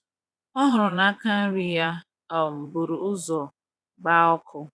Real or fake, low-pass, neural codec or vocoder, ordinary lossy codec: fake; none; vocoder, 22.05 kHz, 80 mel bands, Vocos; none